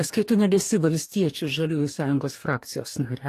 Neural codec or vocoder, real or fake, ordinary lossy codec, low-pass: codec, 44.1 kHz, 2.6 kbps, DAC; fake; AAC, 64 kbps; 14.4 kHz